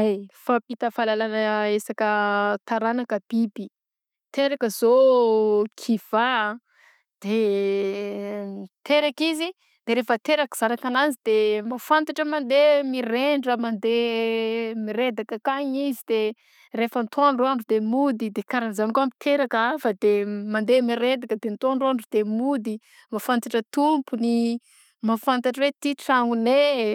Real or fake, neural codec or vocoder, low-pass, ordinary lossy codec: real; none; 19.8 kHz; none